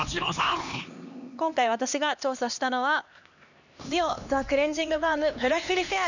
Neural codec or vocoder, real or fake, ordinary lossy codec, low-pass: codec, 16 kHz, 2 kbps, X-Codec, HuBERT features, trained on LibriSpeech; fake; none; 7.2 kHz